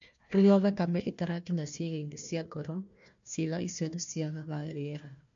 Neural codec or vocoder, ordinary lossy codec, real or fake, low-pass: codec, 16 kHz, 1 kbps, FunCodec, trained on Chinese and English, 50 frames a second; MP3, 48 kbps; fake; 7.2 kHz